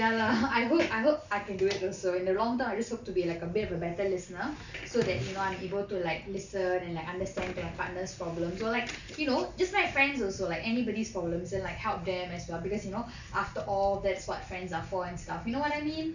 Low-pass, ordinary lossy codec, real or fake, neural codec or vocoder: 7.2 kHz; none; real; none